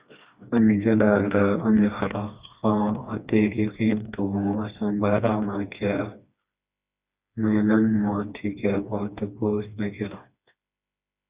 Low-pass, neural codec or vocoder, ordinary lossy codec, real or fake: 3.6 kHz; codec, 16 kHz, 2 kbps, FreqCodec, smaller model; Opus, 64 kbps; fake